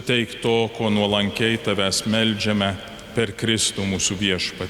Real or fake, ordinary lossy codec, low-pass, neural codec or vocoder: real; Opus, 64 kbps; 19.8 kHz; none